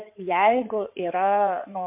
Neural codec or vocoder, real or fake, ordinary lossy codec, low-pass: codec, 16 kHz in and 24 kHz out, 2.2 kbps, FireRedTTS-2 codec; fake; MP3, 32 kbps; 3.6 kHz